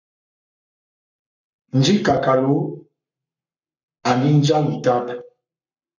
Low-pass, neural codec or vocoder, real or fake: 7.2 kHz; codec, 44.1 kHz, 7.8 kbps, Pupu-Codec; fake